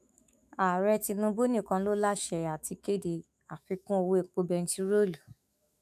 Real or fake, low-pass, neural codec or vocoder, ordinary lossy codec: fake; 14.4 kHz; autoencoder, 48 kHz, 128 numbers a frame, DAC-VAE, trained on Japanese speech; none